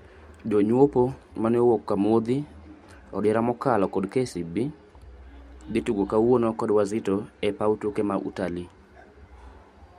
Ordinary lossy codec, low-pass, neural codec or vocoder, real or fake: MP3, 64 kbps; 19.8 kHz; none; real